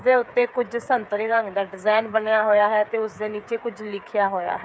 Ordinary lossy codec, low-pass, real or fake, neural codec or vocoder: none; none; fake; codec, 16 kHz, 8 kbps, FreqCodec, smaller model